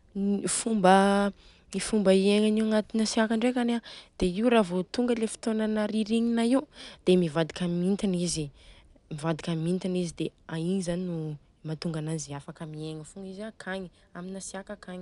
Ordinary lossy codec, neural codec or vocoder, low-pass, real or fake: none; none; 10.8 kHz; real